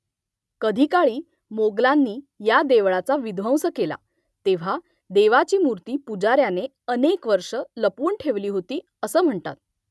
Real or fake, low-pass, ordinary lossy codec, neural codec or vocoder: real; none; none; none